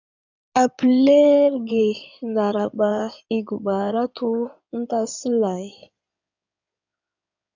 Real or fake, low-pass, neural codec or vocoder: fake; 7.2 kHz; codec, 16 kHz in and 24 kHz out, 2.2 kbps, FireRedTTS-2 codec